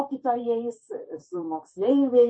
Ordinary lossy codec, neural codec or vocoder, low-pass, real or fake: MP3, 32 kbps; vocoder, 44.1 kHz, 128 mel bands, Pupu-Vocoder; 9.9 kHz; fake